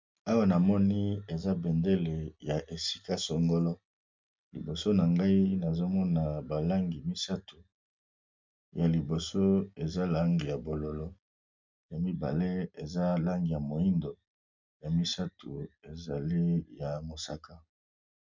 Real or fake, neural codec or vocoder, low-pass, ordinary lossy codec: real; none; 7.2 kHz; MP3, 64 kbps